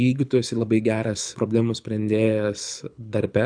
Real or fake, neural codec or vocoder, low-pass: fake; codec, 24 kHz, 6 kbps, HILCodec; 9.9 kHz